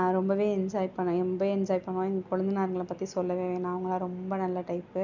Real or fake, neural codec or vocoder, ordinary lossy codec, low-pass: real; none; none; 7.2 kHz